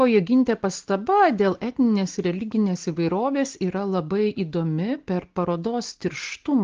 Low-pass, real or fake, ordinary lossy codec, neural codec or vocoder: 7.2 kHz; real; Opus, 16 kbps; none